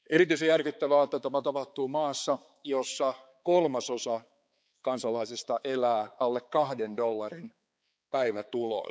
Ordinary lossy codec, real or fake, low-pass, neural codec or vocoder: none; fake; none; codec, 16 kHz, 4 kbps, X-Codec, HuBERT features, trained on balanced general audio